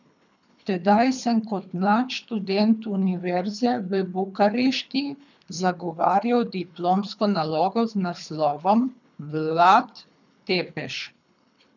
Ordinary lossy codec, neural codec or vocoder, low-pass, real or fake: none; codec, 24 kHz, 3 kbps, HILCodec; 7.2 kHz; fake